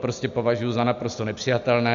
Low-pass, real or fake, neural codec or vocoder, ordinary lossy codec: 7.2 kHz; real; none; AAC, 96 kbps